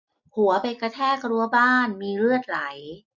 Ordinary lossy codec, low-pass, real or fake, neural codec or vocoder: none; 7.2 kHz; real; none